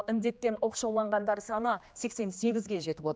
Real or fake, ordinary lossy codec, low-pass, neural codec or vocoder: fake; none; none; codec, 16 kHz, 2 kbps, X-Codec, HuBERT features, trained on general audio